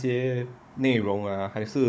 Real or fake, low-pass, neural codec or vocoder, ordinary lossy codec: fake; none; codec, 16 kHz, 16 kbps, FunCodec, trained on Chinese and English, 50 frames a second; none